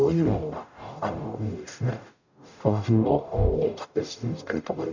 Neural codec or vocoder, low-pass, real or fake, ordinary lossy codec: codec, 44.1 kHz, 0.9 kbps, DAC; 7.2 kHz; fake; none